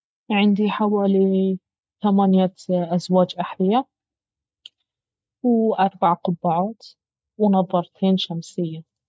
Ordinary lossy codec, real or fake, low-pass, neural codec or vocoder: none; real; none; none